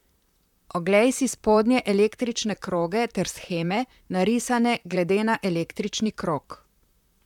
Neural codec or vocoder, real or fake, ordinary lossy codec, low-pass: vocoder, 44.1 kHz, 128 mel bands, Pupu-Vocoder; fake; none; 19.8 kHz